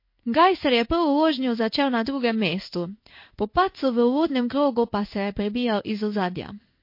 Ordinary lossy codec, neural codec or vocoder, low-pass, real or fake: MP3, 32 kbps; codec, 16 kHz in and 24 kHz out, 1 kbps, XY-Tokenizer; 5.4 kHz; fake